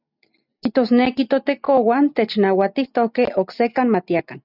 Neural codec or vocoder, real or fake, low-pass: none; real; 5.4 kHz